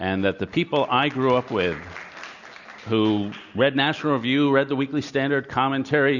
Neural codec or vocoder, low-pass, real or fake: none; 7.2 kHz; real